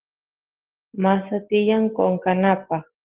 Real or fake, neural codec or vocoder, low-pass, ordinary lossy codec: real; none; 3.6 kHz; Opus, 16 kbps